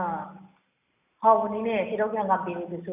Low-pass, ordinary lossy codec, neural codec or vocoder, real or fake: 3.6 kHz; none; none; real